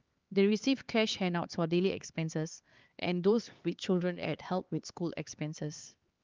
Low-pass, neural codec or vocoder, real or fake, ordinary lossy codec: 7.2 kHz; codec, 16 kHz, 4 kbps, X-Codec, HuBERT features, trained on LibriSpeech; fake; Opus, 32 kbps